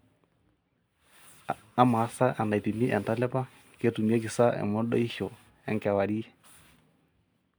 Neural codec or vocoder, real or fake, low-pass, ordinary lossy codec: none; real; none; none